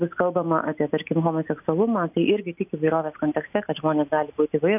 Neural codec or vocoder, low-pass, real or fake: none; 3.6 kHz; real